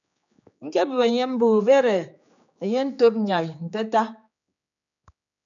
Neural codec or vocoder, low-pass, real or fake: codec, 16 kHz, 4 kbps, X-Codec, HuBERT features, trained on general audio; 7.2 kHz; fake